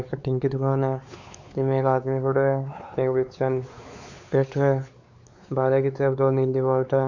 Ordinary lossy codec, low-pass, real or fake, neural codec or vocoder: none; 7.2 kHz; fake; codec, 16 kHz, 4 kbps, X-Codec, WavLM features, trained on Multilingual LibriSpeech